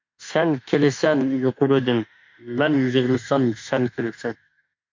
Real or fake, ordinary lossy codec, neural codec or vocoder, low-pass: fake; MP3, 48 kbps; autoencoder, 48 kHz, 32 numbers a frame, DAC-VAE, trained on Japanese speech; 7.2 kHz